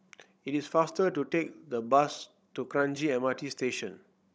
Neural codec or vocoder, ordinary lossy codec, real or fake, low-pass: codec, 16 kHz, 16 kbps, FunCodec, trained on Chinese and English, 50 frames a second; none; fake; none